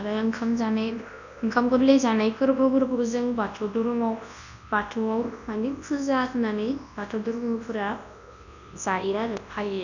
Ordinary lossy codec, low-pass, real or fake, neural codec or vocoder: none; 7.2 kHz; fake; codec, 24 kHz, 0.9 kbps, WavTokenizer, large speech release